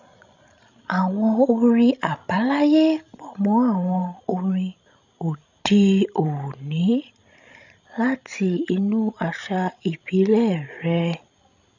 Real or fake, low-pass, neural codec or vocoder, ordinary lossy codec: fake; 7.2 kHz; codec, 16 kHz, 16 kbps, FreqCodec, larger model; none